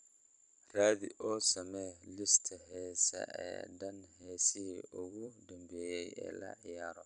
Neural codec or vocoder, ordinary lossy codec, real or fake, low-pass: vocoder, 44.1 kHz, 128 mel bands every 256 samples, BigVGAN v2; none; fake; 10.8 kHz